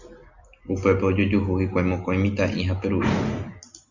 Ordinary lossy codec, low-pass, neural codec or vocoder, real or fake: Opus, 64 kbps; 7.2 kHz; none; real